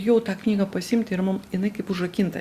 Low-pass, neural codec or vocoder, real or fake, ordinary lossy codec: 14.4 kHz; none; real; Opus, 64 kbps